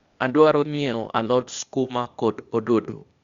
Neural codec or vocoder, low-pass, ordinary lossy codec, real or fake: codec, 16 kHz, 0.8 kbps, ZipCodec; 7.2 kHz; Opus, 64 kbps; fake